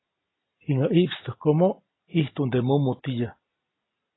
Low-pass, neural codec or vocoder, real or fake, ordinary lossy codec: 7.2 kHz; none; real; AAC, 16 kbps